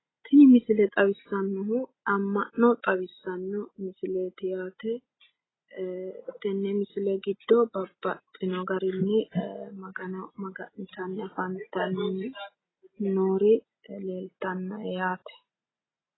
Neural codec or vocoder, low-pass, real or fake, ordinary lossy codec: none; 7.2 kHz; real; AAC, 16 kbps